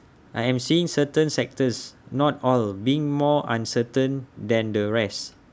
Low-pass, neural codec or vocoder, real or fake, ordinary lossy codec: none; none; real; none